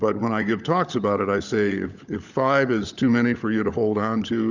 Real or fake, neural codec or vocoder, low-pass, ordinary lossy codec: fake; codec, 16 kHz, 16 kbps, FunCodec, trained on LibriTTS, 50 frames a second; 7.2 kHz; Opus, 64 kbps